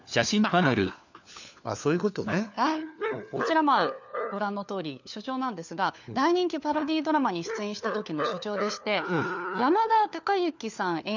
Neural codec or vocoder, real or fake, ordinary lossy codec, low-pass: codec, 16 kHz, 4 kbps, FunCodec, trained on LibriTTS, 50 frames a second; fake; none; 7.2 kHz